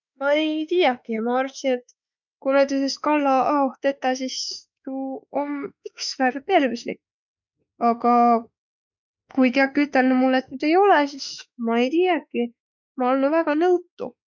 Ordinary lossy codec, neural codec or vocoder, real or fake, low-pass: none; autoencoder, 48 kHz, 32 numbers a frame, DAC-VAE, trained on Japanese speech; fake; 7.2 kHz